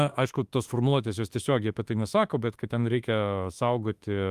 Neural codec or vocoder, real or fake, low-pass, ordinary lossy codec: autoencoder, 48 kHz, 32 numbers a frame, DAC-VAE, trained on Japanese speech; fake; 14.4 kHz; Opus, 32 kbps